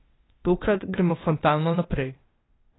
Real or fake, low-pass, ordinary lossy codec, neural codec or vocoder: fake; 7.2 kHz; AAC, 16 kbps; codec, 16 kHz, 0.8 kbps, ZipCodec